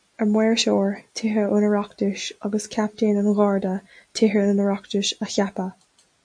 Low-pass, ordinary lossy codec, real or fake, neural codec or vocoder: 9.9 kHz; AAC, 64 kbps; real; none